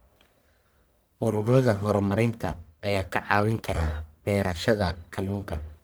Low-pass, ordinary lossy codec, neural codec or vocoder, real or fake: none; none; codec, 44.1 kHz, 1.7 kbps, Pupu-Codec; fake